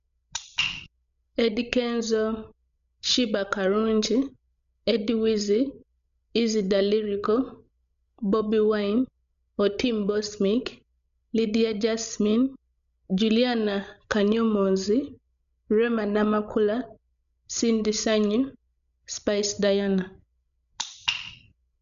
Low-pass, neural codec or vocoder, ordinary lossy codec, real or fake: 7.2 kHz; codec, 16 kHz, 16 kbps, FreqCodec, larger model; none; fake